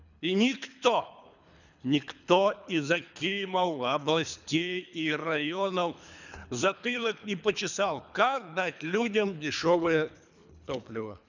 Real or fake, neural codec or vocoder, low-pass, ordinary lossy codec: fake; codec, 24 kHz, 3 kbps, HILCodec; 7.2 kHz; none